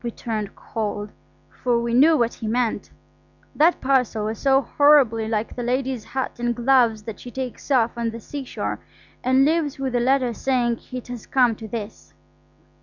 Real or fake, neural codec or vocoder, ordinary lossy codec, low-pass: real; none; Opus, 64 kbps; 7.2 kHz